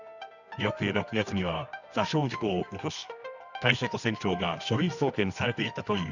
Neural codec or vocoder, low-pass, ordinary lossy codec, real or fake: codec, 24 kHz, 0.9 kbps, WavTokenizer, medium music audio release; 7.2 kHz; none; fake